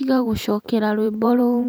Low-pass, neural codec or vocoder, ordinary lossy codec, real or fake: none; vocoder, 44.1 kHz, 128 mel bands every 512 samples, BigVGAN v2; none; fake